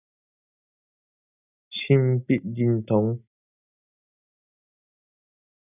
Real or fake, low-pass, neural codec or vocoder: real; 3.6 kHz; none